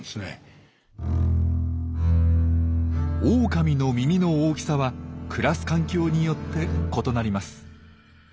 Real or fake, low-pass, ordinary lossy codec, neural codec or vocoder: real; none; none; none